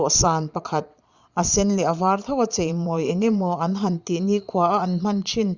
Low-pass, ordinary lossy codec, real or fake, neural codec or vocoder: 7.2 kHz; Opus, 64 kbps; fake; vocoder, 22.05 kHz, 80 mel bands, Vocos